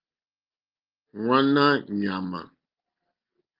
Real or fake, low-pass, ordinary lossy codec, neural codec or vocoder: real; 5.4 kHz; Opus, 32 kbps; none